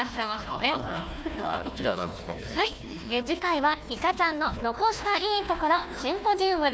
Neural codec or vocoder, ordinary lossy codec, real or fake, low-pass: codec, 16 kHz, 1 kbps, FunCodec, trained on Chinese and English, 50 frames a second; none; fake; none